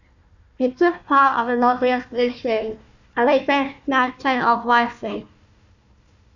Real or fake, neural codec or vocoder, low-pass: fake; codec, 16 kHz, 1 kbps, FunCodec, trained on Chinese and English, 50 frames a second; 7.2 kHz